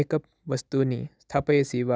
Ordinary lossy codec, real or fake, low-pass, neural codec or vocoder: none; real; none; none